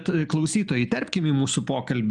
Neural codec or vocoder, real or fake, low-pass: none; real; 10.8 kHz